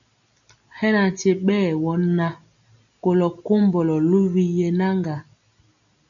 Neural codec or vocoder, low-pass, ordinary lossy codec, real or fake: none; 7.2 kHz; MP3, 96 kbps; real